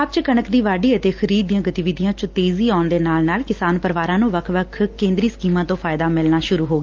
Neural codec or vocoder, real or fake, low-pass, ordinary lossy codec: none; real; 7.2 kHz; Opus, 32 kbps